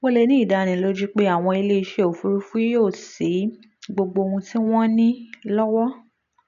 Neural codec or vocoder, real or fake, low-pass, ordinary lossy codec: none; real; 7.2 kHz; none